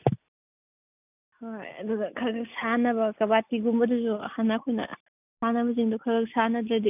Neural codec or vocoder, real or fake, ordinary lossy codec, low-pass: none; real; none; 3.6 kHz